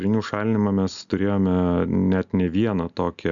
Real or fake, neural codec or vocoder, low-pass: real; none; 7.2 kHz